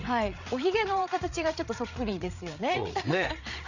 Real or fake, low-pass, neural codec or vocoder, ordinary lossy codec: fake; 7.2 kHz; codec, 16 kHz, 8 kbps, FreqCodec, larger model; none